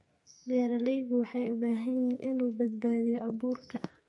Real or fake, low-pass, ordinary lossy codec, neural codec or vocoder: fake; 10.8 kHz; MP3, 48 kbps; codec, 44.1 kHz, 2.6 kbps, SNAC